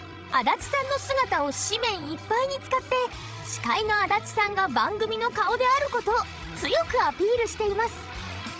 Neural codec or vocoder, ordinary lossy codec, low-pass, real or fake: codec, 16 kHz, 16 kbps, FreqCodec, larger model; none; none; fake